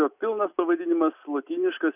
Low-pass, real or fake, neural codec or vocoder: 3.6 kHz; real; none